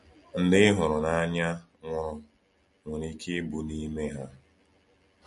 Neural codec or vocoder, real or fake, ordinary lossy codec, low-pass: none; real; MP3, 48 kbps; 14.4 kHz